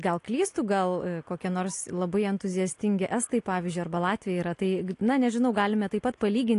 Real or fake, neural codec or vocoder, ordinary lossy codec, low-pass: real; none; AAC, 48 kbps; 10.8 kHz